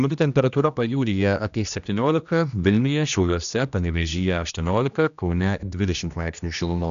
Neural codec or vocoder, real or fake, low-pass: codec, 16 kHz, 1 kbps, X-Codec, HuBERT features, trained on general audio; fake; 7.2 kHz